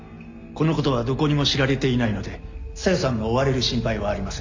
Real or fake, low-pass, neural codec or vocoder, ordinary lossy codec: real; 7.2 kHz; none; MP3, 48 kbps